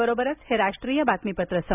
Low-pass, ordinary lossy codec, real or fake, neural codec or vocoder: 3.6 kHz; none; real; none